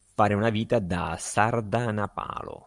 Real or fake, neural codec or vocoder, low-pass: fake; vocoder, 44.1 kHz, 128 mel bands every 512 samples, BigVGAN v2; 10.8 kHz